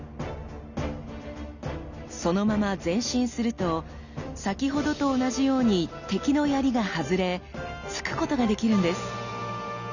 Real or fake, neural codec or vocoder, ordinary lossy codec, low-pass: real; none; none; 7.2 kHz